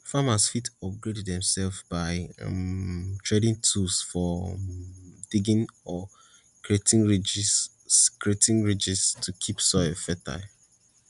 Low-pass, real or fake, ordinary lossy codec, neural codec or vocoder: 10.8 kHz; real; none; none